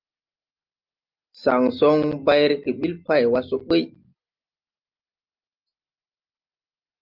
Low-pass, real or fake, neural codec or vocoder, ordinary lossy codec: 5.4 kHz; real; none; Opus, 24 kbps